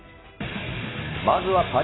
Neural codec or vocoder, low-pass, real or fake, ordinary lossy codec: none; 7.2 kHz; real; AAC, 16 kbps